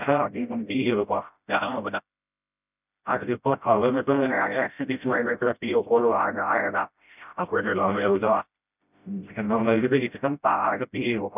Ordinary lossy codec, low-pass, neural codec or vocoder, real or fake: none; 3.6 kHz; codec, 16 kHz, 0.5 kbps, FreqCodec, smaller model; fake